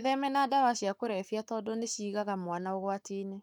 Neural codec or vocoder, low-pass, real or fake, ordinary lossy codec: codec, 44.1 kHz, 7.8 kbps, Pupu-Codec; 19.8 kHz; fake; none